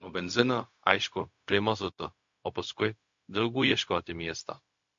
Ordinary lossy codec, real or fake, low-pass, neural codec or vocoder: MP3, 48 kbps; fake; 7.2 kHz; codec, 16 kHz, 0.4 kbps, LongCat-Audio-Codec